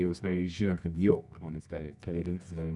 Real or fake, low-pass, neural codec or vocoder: fake; 10.8 kHz; codec, 24 kHz, 0.9 kbps, WavTokenizer, medium music audio release